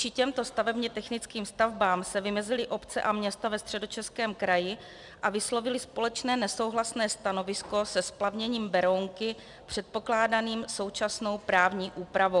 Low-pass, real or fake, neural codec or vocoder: 10.8 kHz; real; none